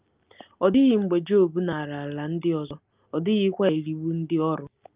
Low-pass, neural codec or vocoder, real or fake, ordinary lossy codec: 3.6 kHz; none; real; Opus, 24 kbps